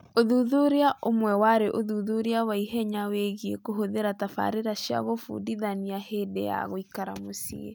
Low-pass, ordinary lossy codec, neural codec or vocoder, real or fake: none; none; none; real